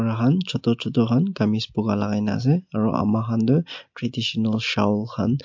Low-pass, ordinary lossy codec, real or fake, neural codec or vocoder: 7.2 kHz; MP3, 48 kbps; real; none